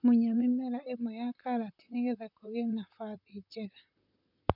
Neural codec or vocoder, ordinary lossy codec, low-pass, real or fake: vocoder, 44.1 kHz, 128 mel bands, Pupu-Vocoder; none; 5.4 kHz; fake